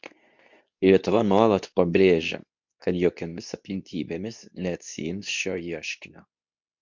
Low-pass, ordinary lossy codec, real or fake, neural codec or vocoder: 7.2 kHz; MP3, 64 kbps; fake; codec, 24 kHz, 0.9 kbps, WavTokenizer, medium speech release version 2